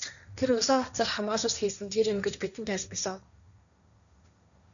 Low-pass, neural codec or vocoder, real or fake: 7.2 kHz; codec, 16 kHz, 1.1 kbps, Voila-Tokenizer; fake